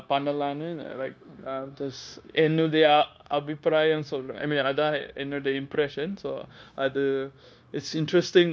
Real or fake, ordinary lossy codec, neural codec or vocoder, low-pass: fake; none; codec, 16 kHz, 0.9 kbps, LongCat-Audio-Codec; none